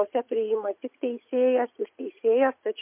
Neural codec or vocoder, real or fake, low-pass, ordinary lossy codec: vocoder, 44.1 kHz, 80 mel bands, Vocos; fake; 3.6 kHz; MP3, 32 kbps